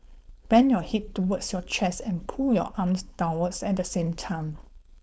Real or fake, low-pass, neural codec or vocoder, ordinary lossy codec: fake; none; codec, 16 kHz, 4.8 kbps, FACodec; none